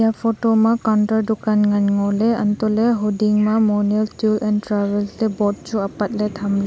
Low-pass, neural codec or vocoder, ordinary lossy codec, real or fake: none; none; none; real